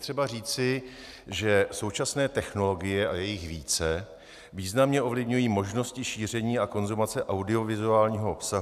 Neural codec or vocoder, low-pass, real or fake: none; 14.4 kHz; real